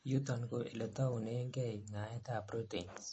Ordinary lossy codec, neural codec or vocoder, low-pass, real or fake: MP3, 32 kbps; none; 10.8 kHz; real